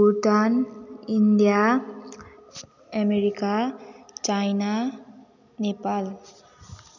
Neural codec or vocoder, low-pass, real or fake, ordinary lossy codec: none; 7.2 kHz; real; none